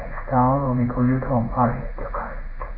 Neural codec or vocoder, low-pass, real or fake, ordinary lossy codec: codec, 16 kHz in and 24 kHz out, 1 kbps, XY-Tokenizer; 5.4 kHz; fake; none